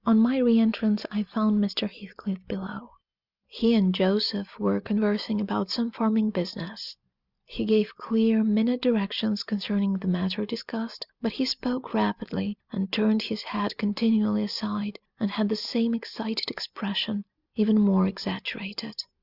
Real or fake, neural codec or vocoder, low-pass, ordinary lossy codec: real; none; 5.4 kHz; Opus, 64 kbps